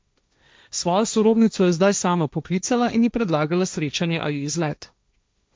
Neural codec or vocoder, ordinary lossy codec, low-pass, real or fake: codec, 16 kHz, 1.1 kbps, Voila-Tokenizer; none; none; fake